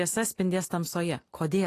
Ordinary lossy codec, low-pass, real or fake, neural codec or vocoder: AAC, 48 kbps; 14.4 kHz; real; none